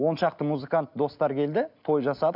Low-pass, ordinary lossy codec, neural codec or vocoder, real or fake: 5.4 kHz; none; none; real